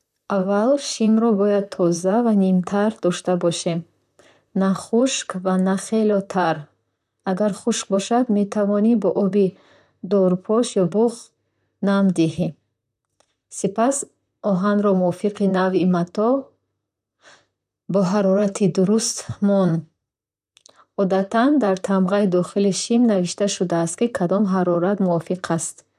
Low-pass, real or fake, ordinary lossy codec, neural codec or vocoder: 14.4 kHz; fake; none; vocoder, 44.1 kHz, 128 mel bands, Pupu-Vocoder